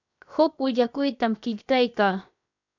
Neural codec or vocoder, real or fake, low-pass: codec, 16 kHz, 0.7 kbps, FocalCodec; fake; 7.2 kHz